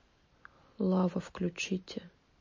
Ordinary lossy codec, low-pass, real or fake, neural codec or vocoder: MP3, 32 kbps; 7.2 kHz; real; none